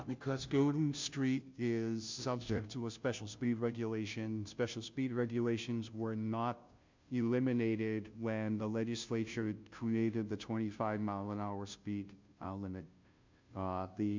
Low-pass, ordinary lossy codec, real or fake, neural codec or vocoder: 7.2 kHz; MP3, 64 kbps; fake; codec, 16 kHz, 0.5 kbps, FunCodec, trained on Chinese and English, 25 frames a second